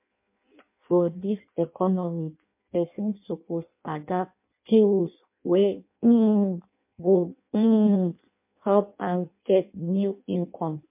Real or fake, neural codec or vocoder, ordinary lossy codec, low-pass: fake; codec, 16 kHz in and 24 kHz out, 0.6 kbps, FireRedTTS-2 codec; MP3, 32 kbps; 3.6 kHz